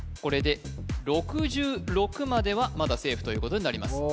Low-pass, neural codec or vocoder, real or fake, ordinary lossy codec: none; none; real; none